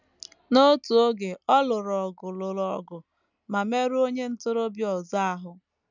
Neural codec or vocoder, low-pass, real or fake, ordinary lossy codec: none; 7.2 kHz; real; none